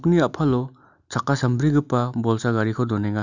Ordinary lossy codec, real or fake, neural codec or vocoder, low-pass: none; real; none; 7.2 kHz